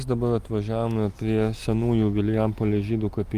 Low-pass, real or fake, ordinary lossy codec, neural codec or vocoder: 14.4 kHz; real; Opus, 32 kbps; none